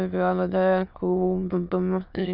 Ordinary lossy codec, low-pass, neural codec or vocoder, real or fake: none; 5.4 kHz; autoencoder, 22.05 kHz, a latent of 192 numbers a frame, VITS, trained on many speakers; fake